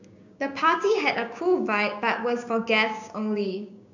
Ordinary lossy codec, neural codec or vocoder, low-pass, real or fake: none; codec, 16 kHz, 6 kbps, DAC; 7.2 kHz; fake